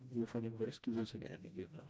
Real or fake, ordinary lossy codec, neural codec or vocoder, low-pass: fake; none; codec, 16 kHz, 1 kbps, FreqCodec, smaller model; none